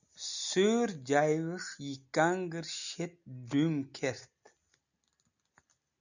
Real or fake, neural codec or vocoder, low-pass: real; none; 7.2 kHz